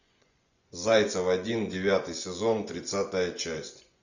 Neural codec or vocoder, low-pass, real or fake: vocoder, 24 kHz, 100 mel bands, Vocos; 7.2 kHz; fake